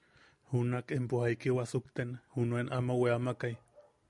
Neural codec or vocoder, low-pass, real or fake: none; 10.8 kHz; real